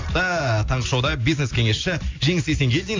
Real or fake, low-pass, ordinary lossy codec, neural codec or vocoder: real; 7.2 kHz; AAC, 48 kbps; none